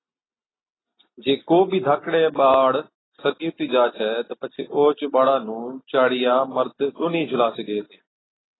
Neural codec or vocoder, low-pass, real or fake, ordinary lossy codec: none; 7.2 kHz; real; AAC, 16 kbps